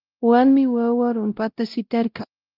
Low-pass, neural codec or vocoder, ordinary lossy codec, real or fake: 5.4 kHz; codec, 16 kHz, 0.5 kbps, X-Codec, WavLM features, trained on Multilingual LibriSpeech; Opus, 32 kbps; fake